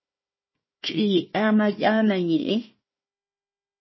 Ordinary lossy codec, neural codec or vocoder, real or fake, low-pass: MP3, 24 kbps; codec, 16 kHz, 1 kbps, FunCodec, trained on Chinese and English, 50 frames a second; fake; 7.2 kHz